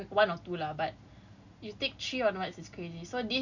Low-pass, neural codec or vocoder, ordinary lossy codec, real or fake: 7.2 kHz; none; none; real